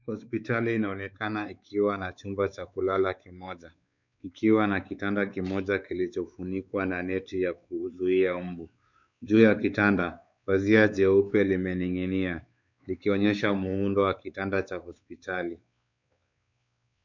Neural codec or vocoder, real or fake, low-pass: codec, 16 kHz, 4 kbps, X-Codec, WavLM features, trained on Multilingual LibriSpeech; fake; 7.2 kHz